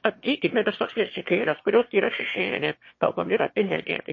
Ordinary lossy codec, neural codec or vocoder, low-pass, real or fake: MP3, 32 kbps; autoencoder, 22.05 kHz, a latent of 192 numbers a frame, VITS, trained on one speaker; 7.2 kHz; fake